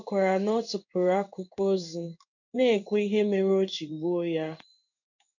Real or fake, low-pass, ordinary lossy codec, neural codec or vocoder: fake; 7.2 kHz; none; codec, 16 kHz in and 24 kHz out, 1 kbps, XY-Tokenizer